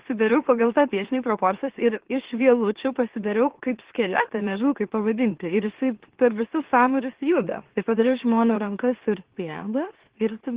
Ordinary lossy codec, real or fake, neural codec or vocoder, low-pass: Opus, 16 kbps; fake; autoencoder, 44.1 kHz, a latent of 192 numbers a frame, MeloTTS; 3.6 kHz